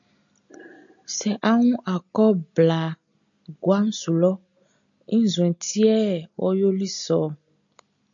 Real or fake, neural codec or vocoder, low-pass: real; none; 7.2 kHz